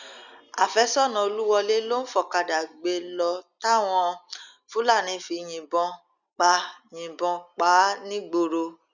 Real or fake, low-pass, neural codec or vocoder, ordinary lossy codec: real; 7.2 kHz; none; none